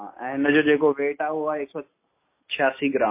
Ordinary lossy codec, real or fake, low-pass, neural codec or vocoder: MP3, 24 kbps; real; 3.6 kHz; none